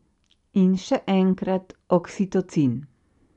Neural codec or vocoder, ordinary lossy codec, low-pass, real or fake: none; none; 10.8 kHz; real